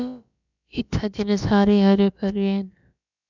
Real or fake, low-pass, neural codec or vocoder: fake; 7.2 kHz; codec, 16 kHz, about 1 kbps, DyCAST, with the encoder's durations